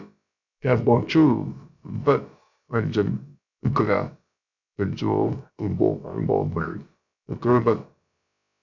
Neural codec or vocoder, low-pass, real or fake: codec, 16 kHz, about 1 kbps, DyCAST, with the encoder's durations; 7.2 kHz; fake